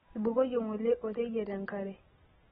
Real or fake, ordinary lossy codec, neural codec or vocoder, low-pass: real; AAC, 16 kbps; none; 19.8 kHz